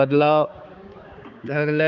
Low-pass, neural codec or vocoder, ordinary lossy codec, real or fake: 7.2 kHz; codec, 16 kHz, 4 kbps, X-Codec, HuBERT features, trained on balanced general audio; Opus, 64 kbps; fake